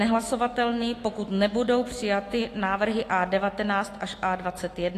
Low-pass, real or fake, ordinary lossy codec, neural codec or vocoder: 14.4 kHz; fake; AAC, 48 kbps; autoencoder, 48 kHz, 128 numbers a frame, DAC-VAE, trained on Japanese speech